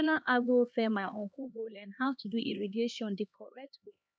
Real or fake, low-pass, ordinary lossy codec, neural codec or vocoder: fake; none; none; codec, 16 kHz, 2 kbps, X-Codec, HuBERT features, trained on LibriSpeech